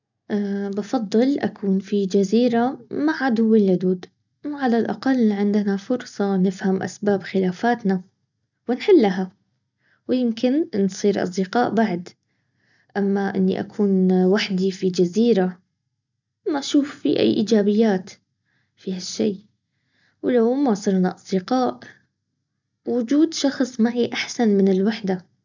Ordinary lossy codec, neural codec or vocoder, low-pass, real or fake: none; none; 7.2 kHz; real